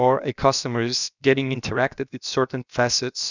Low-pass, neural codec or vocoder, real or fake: 7.2 kHz; codec, 16 kHz, about 1 kbps, DyCAST, with the encoder's durations; fake